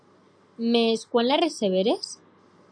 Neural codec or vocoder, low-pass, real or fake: none; 9.9 kHz; real